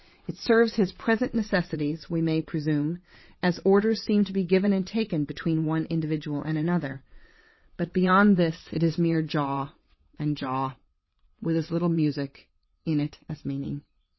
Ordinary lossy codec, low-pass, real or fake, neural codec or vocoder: MP3, 24 kbps; 7.2 kHz; fake; vocoder, 22.05 kHz, 80 mel bands, WaveNeXt